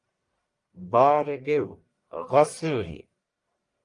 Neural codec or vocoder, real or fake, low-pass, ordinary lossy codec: codec, 44.1 kHz, 1.7 kbps, Pupu-Codec; fake; 10.8 kHz; Opus, 32 kbps